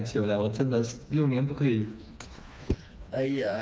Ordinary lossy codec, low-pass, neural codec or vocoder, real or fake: none; none; codec, 16 kHz, 2 kbps, FreqCodec, smaller model; fake